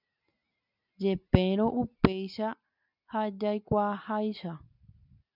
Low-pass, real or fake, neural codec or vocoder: 5.4 kHz; real; none